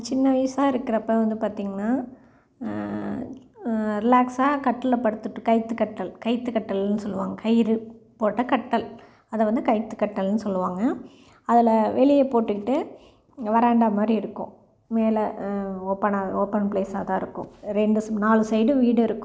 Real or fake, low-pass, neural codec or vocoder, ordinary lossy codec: real; none; none; none